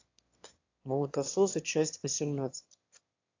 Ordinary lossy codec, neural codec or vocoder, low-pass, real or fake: AAC, 48 kbps; autoencoder, 22.05 kHz, a latent of 192 numbers a frame, VITS, trained on one speaker; 7.2 kHz; fake